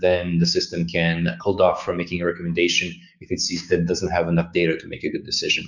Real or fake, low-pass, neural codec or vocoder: fake; 7.2 kHz; codec, 16 kHz, 6 kbps, DAC